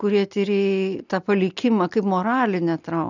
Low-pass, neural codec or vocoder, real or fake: 7.2 kHz; none; real